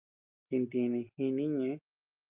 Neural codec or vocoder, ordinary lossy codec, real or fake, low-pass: none; Opus, 24 kbps; real; 3.6 kHz